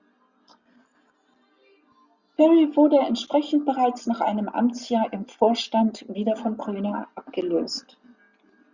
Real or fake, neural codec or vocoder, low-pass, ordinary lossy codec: real; none; 7.2 kHz; Opus, 64 kbps